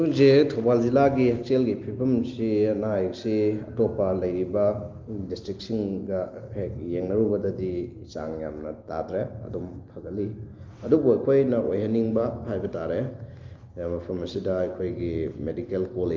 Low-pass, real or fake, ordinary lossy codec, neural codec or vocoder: 7.2 kHz; real; Opus, 24 kbps; none